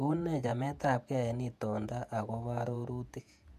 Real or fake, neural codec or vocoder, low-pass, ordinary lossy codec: fake; vocoder, 48 kHz, 128 mel bands, Vocos; 14.4 kHz; MP3, 96 kbps